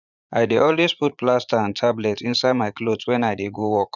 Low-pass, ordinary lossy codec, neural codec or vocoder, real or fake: 7.2 kHz; none; vocoder, 44.1 kHz, 80 mel bands, Vocos; fake